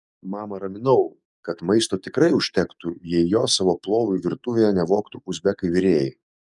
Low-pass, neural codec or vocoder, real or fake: 10.8 kHz; codec, 44.1 kHz, 7.8 kbps, DAC; fake